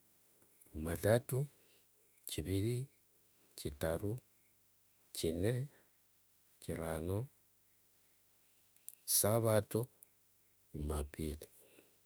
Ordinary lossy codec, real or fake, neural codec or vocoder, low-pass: none; fake; autoencoder, 48 kHz, 32 numbers a frame, DAC-VAE, trained on Japanese speech; none